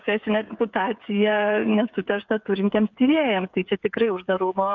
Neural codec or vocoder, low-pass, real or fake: codec, 16 kHz, 8 kbps, FreqCodec, smaller model; 7.2 kHz; fake